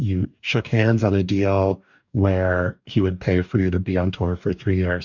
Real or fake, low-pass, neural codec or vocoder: fake; 7.2 kHz; codec, 44.1 kHz, 2.6 kbps, DAC